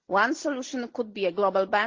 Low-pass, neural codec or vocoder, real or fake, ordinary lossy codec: 7.2 kHz; none; real; Opus, 16 kbps